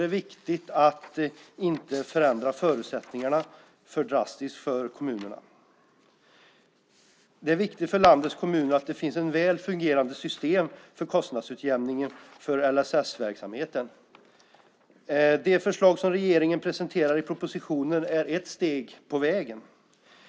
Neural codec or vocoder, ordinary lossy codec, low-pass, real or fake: none; none; none; real